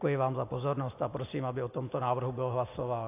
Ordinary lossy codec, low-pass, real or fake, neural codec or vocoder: AAC, 24 kbps; 3.6 kHz; real; none